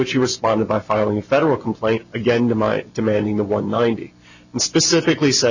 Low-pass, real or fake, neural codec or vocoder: 7.2 kHz; real; none